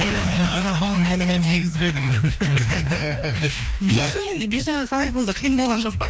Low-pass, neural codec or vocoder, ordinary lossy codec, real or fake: none; codec, 16 kHz, 1 kbps, FreqCodec, larger model; none; fake